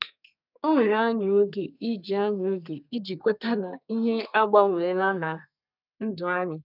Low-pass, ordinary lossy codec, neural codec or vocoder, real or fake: 5.4 kHz; none; codec, 32 kHz, 1.9 kbps, SNAC; fake